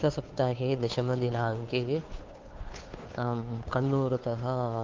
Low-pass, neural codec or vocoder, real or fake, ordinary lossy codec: 7.2 kHz; codec, 16 kHz, 2 kbps, FunCodec, trained on Chinese and English, 25 frames a second; fake; Opus, 32 kbps